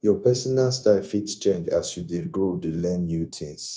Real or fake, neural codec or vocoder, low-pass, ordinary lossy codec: fake; codec, 16 kHz, 0.9 kbps, LongCat-Audio-Codec; none; none